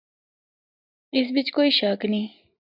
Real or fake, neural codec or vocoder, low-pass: real; none; 5.4 kHz